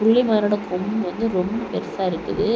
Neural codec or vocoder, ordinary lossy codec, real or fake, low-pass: none; none; real; none